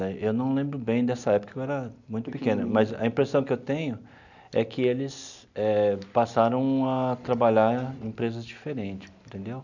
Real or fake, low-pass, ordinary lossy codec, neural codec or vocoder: real; 7.2 kHz; none; none